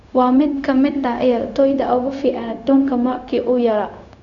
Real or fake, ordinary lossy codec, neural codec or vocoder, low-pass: fake; none; codec, 16 kHz, 0.4 kbps, LongCat-Audio-Codec; 7.2 kHz